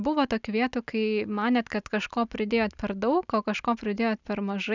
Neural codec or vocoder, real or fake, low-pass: none; real; 7.2 kHz